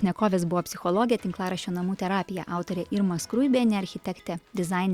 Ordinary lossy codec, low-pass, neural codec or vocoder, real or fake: Opus, 64 kbps; 19.8 kHz; none; real